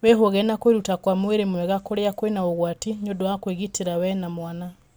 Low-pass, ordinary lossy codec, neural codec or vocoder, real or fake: none; none; none; real